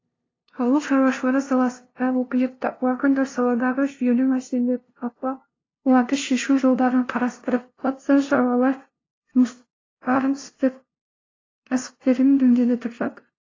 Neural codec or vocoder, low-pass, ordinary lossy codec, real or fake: codec, 16 kHz, 0.5 kbps, FunCodec, trained on LibriTTS, 25 frames a second; 7.2 kHz; AAC, 32 kbps; fake